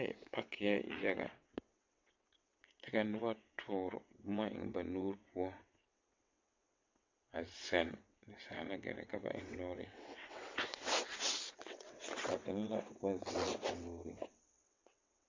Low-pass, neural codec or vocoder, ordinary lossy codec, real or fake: 7.2 kHz; vocoder, 22.05 kHz, 80 mel bands, WaveNeXt; MP3, 48 kbps; fake